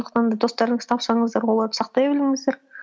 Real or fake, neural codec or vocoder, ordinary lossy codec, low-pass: real; none; none; none